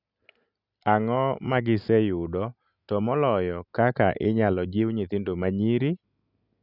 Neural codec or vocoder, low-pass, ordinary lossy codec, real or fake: none; 5.4 kHz; none; real